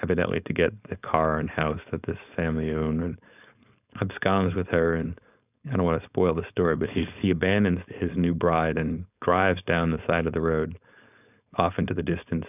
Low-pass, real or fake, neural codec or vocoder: 3.6 kHz; fake; codec, 16 kHz, 4.8 kbps, FACodec